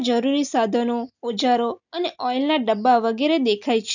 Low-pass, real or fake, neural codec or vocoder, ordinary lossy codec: 7.2 kHz; real; none; none